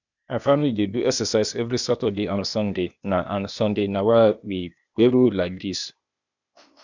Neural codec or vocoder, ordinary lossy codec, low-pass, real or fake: codec, 16 kHz, 0.8 kbps, ZipCodec; none; 7.2 kHz; fake